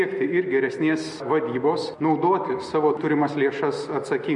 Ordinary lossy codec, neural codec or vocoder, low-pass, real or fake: MP3, 48 kbps; none; 10.8 kHz; real